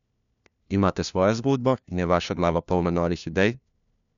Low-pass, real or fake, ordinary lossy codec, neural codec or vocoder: 7.2 kHz; fake; none; codec, 16 kHz, 1 kbps, FunCodec, trained on LibriTTS, 50 frames a second